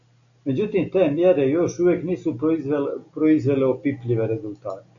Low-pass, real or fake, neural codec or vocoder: 7.2 kHz; real; none